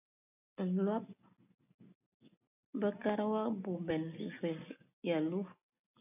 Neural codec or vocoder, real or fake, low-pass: none; real; 3.6 kHz